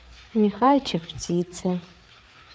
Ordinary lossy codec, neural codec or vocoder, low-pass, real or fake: none; codec, 16 kHz, 4 kbps, FreqCodec, larger model; none; fake